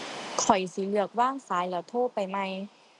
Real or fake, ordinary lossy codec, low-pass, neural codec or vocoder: real; none; 10.8 kHz; none